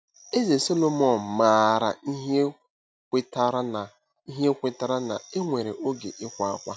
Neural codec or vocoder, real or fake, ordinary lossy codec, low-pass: none; real; none; none